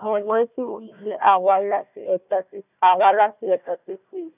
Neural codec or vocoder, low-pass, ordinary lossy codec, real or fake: codec, 16 kHz, 1 kbps, FunCodec, trained on Chinese and English, 50 frames a second; 3.6 kHz; none; fake